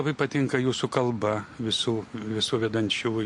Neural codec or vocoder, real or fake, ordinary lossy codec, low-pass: none; real; MP3, 48 kbps; 10.8 kHz